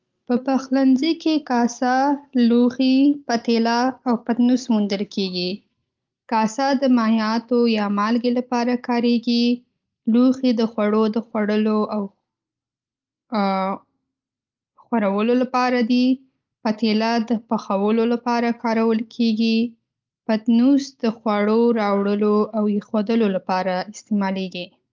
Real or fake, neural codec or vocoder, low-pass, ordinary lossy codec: real; none; 7.2 kHz; Opus, 32 kbps